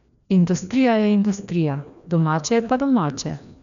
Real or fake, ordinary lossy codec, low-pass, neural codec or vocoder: fake; none; 7.2 kHz; codec, 16 kHz, 1 kbps, FreqCodec, larger model